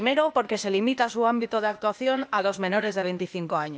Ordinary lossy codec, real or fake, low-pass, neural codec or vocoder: none; fake; none; codec, 16 kHz, 0.8 kbps, ZipCodec